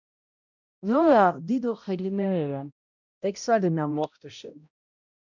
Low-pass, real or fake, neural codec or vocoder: 7.2 kHz; fake; codec, 16 kHz, 0.5 kbps, X-Codec, HuBERT features, trained on balanced general audio